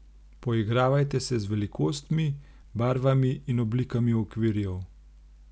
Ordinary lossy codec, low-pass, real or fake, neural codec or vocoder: none; none; real; none